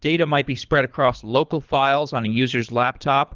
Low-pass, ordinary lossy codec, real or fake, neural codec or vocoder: 7.2 kHz; Opus, 32 kbps; fake; codec, 24 kHz, 3 kbps, HILCodec